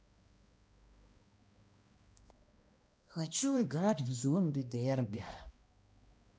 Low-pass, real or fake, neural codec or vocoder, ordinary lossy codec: none; fake; codec, 16 kHz, 1 kbps, X-Codec, HuBERT features, trained on balanced general audio; none